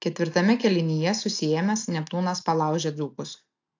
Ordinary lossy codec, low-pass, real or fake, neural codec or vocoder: AAC, 48 kbps; 7.2 kHz; real; none